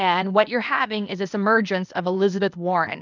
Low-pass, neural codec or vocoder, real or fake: 7.2 kHz; codec, 16 kHz, 0.8 kbps, ZipCodec; fake